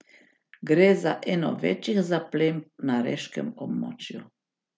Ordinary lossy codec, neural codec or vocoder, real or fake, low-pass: none; none; real; none